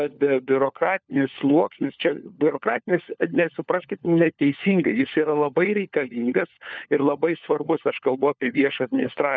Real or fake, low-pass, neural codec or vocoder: fake; 7.2 kHz; codec, 16 kHz, 4 kbps, FunCodec, trained on LibriTTS, 50 frames a second